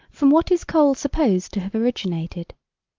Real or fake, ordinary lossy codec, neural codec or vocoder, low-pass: real; Opus, 24 kbps; none; 7.2 kHz